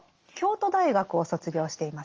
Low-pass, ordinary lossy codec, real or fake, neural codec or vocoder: 7.2 kHz; Opus, 24 kbps; real; none